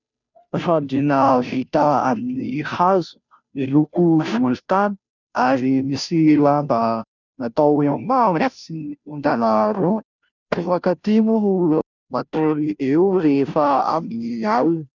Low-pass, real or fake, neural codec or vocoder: 7.2 kHz; fake; codec, 16 kHz, 0.5 kbps, FunCodec, trained on Chinese and English, 25 frames a second